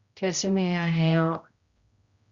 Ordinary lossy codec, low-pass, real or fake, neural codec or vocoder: Opus, 64 kbps; 7.2 kHz; fake; codec, 16 kHz, 0.5 kbps, X-Codec, HuBERT features, trained on general audio